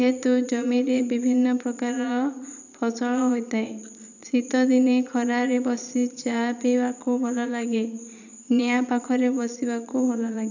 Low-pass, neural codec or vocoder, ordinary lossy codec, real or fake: 7.2 kHz; vocoder, 22.05 kHz, 80 mel bands, Vocos; none; fake